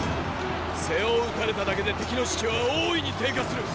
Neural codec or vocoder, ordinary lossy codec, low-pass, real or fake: none; none; none; real